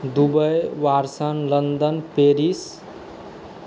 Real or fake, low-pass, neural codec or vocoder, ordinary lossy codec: real; none; none; none